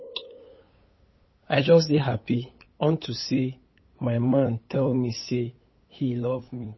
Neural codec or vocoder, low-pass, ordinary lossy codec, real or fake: codec, 16 kHz, 8 kbps, FunCodec, trained on LibriTTS, 25 frames a second; 7.2 kHz; MP3, 24 kbps; fake